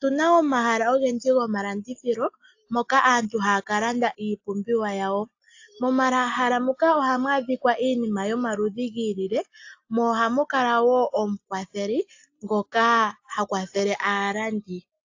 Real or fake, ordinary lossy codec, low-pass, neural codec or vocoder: real; AAC, 48 kbps; 7.2 kHz; none